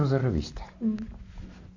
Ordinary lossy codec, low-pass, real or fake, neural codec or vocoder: AAC, 48 kbps; 7.2 kHz; real; none